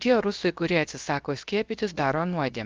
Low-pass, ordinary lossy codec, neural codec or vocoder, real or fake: 7.2 kHz; Opus, 24 kbps; codec, 16 kHz, 0.7 kbps, FocalCodec; fake